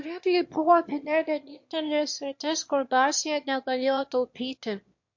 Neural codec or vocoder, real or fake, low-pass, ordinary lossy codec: autoencoder, 22.05 kHz, a latent of 192 numbers a frame, VITS, trained on one speaker; fake; 7.2 kHz; MP3, 48 kbps